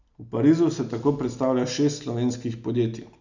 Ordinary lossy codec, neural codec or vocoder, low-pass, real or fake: none; none; 7.2 kHz; real